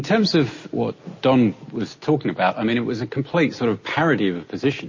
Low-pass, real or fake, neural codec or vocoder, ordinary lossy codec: 7.2 kHz; real; none; MP3, 32 kbps